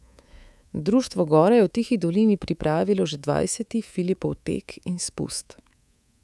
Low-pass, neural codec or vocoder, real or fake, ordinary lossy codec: none; codec, 24 kHz, 3.1 kbps, DualCodec; fake; none